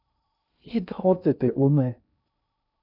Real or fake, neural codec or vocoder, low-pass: fake; codec, 16 kHz in and 24 kHz out, 0.6 kbps, FocalCodec, streaming, 2048 codes; 5.4 kHz